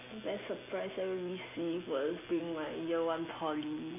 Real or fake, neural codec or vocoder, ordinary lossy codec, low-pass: real; none; none; 3.6 kHz